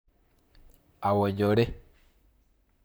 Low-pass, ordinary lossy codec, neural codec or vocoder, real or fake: none; none; vocoder, 44.1 kHz, 128 mel bands, Pupu-Vocoder; fake